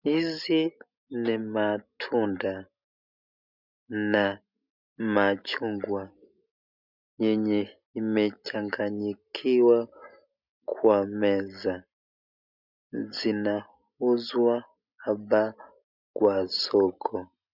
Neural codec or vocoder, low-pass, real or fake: none; 5.4 kHz; real